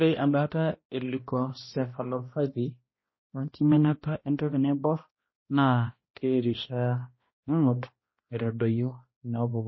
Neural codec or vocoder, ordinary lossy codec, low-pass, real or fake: codec, 16 kHz, 1 kbps, X-Codec, HuBERT features, trained on balanced general audio; MP3, 24 kbps; 7.2 kHz; fake